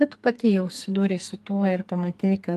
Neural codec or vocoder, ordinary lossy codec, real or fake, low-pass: codec, 44.1 kHz, 2.6 kbps, SNAC; Opus, 32 kbps; fake; 14.4 kHz